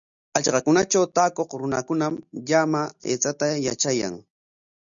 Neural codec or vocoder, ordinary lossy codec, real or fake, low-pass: none; MP3, 96 kbps; real; 7.2 kHz